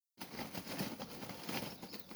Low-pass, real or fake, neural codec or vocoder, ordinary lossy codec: none; fake; vocoder, 44.1 kHz, 128 mel bands every 256 samples, BigVGAN v2; none